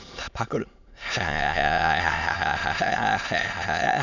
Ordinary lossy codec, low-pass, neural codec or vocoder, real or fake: none; 7.2 kHz; autoencoder, 22.05 kHz, a latent of 192 numbers a frame, VITS, trained on many speakers; fake